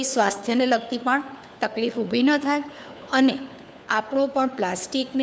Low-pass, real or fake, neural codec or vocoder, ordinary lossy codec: none; fake; codec, 16 kHz, 4 kbps, FunCodec, trained on LibriTTS, 50 frames a second; none